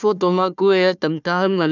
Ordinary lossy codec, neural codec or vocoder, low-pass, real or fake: none; codec, 16 kHz, 1 kbps, FunCodec, trained on Chinese and English, 50 frames a second; 7.2 kHz; fake